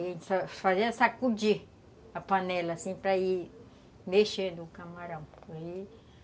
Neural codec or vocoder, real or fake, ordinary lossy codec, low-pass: none; real; none; none